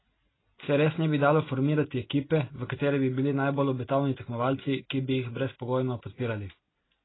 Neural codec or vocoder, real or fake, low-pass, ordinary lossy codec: none; real; 7.2 kHz; AAC, 16 kbps